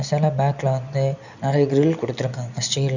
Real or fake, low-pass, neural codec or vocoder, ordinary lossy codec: real; 7.2 kHz; none; none